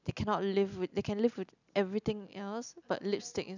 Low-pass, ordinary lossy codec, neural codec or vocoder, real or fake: 7.2 kHz; none; none; real